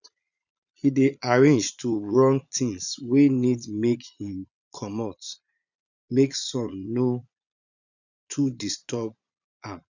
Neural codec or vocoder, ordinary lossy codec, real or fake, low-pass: vocoder, 22.05 kHz, 80 mel bands, Vocos; none; fake; 7.2 kHz